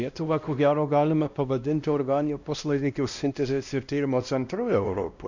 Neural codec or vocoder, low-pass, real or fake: codec, 16 kHz, 1 kbps, X-Codec, WavLM features, trained on Multilingual LibriSpeech; 7.2 kHz; fake